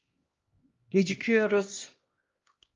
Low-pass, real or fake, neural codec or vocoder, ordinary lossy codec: 7.2 kHz; fake; codec, 16 kHz, 1 kbps, X-Codec, HuBERT features, trained on LibriSpeech; Opus, 32 kbps